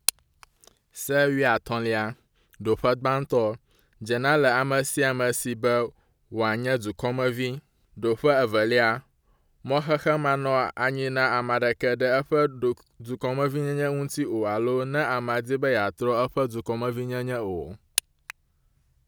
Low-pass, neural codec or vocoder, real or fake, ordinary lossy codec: none; none; real; none